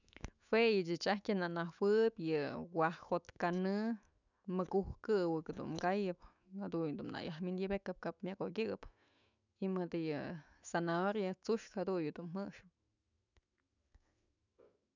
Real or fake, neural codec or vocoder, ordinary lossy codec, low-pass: fake; autoencoder, 48 kHz, 128 numbers a frame, DAC-VAE, trained on Japanese speech; none; 7.2 kHz